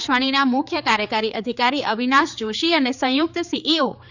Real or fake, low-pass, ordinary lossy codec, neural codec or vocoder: fake; 7.2 kHz; none; codec, 16 kHz, 4 kbps, X-Codec, HuBERT features, trained on general audio